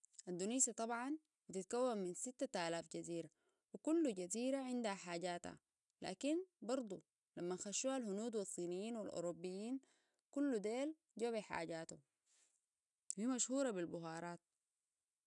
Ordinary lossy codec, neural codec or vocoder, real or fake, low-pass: none; none; real; 10.8 kHz